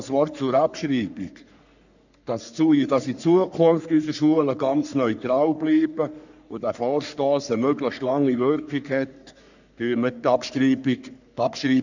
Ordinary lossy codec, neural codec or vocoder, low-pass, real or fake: none; codec, 44.1 kHz, 3.4 kbps, Pupu-Codec; 7.2 kHz; fake